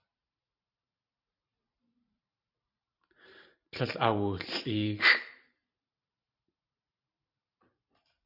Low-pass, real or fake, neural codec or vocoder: 5.4 kHz; fake; vocoder, 44.1 kHz, 128 mel bands every 512 samples, BigVGAN v2